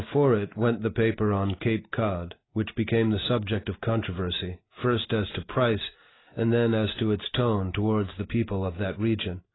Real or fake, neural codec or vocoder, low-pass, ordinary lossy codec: real; none; 7.2 kHz; AAC, 16 kbps